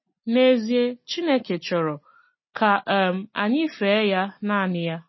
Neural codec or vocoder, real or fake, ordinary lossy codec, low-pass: none; real; MP3, 24 kbps; 7.2 kHz